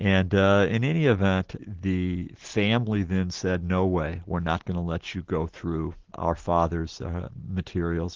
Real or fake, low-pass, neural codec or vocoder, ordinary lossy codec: real; 7.2 kHz; none; Opus, 16 kbps